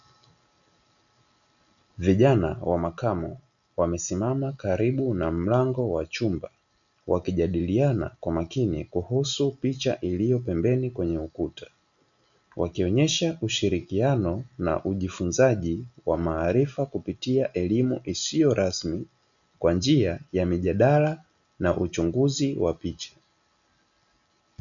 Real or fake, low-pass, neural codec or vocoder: real; 7.2 kHz; none